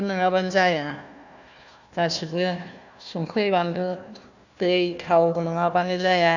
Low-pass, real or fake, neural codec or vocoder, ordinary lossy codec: 7.2 kHz; fake; codec, 16 kHz, 1 kbps, FunCodec, trained on Chinese and English, 50 frames a second; none